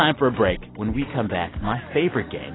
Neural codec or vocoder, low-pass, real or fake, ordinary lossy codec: none; 7.2 kHz; real; AAC, 16 kbps